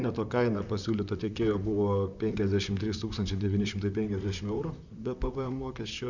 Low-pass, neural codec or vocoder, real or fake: 7.2 kHz; vocoder, 44.1 kHz, 128 mel bands, Pupu-Vocoder; fake